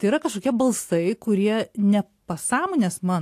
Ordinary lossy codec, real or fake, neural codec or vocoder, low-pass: AAC, 64 kbps; real; none; 14.4 kHz